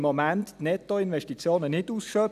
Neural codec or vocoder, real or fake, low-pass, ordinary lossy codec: none; real; 14.4 kHz; none